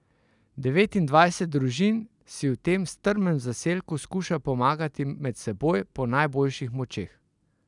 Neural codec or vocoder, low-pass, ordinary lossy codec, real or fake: none; 10.8 kHz; none; real